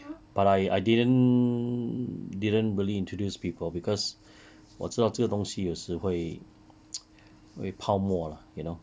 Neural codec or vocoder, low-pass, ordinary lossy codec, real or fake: none; none; none; real